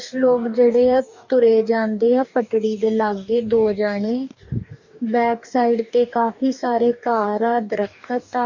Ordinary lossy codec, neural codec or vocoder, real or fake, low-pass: none; codec, 44.1 kHz, 2.6 kbps, DAC; fake; 7.2 kHz